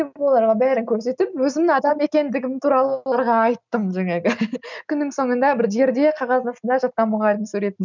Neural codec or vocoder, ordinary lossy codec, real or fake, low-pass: none; none; real; 7.2 kHz